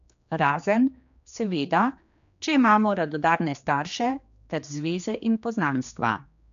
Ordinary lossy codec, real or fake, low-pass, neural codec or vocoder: MP3, 64 kbps; fake; 7.2 kHz; codec, 16 kHz, 2 kbps, X-Codec, HuBERT features, trained on general audio